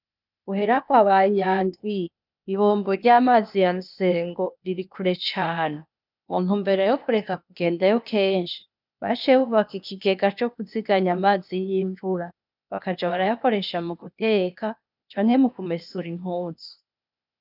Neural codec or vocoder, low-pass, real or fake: codec, 16 kHz, 0.8 kbps, ZipCodec; 5.4 kHz; fake